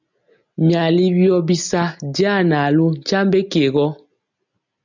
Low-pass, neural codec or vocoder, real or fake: 7.2 kHz; none; real